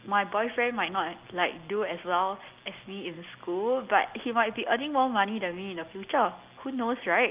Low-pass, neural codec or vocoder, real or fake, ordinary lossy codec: 3.6 kHz; none; real; Opus, 24 kbps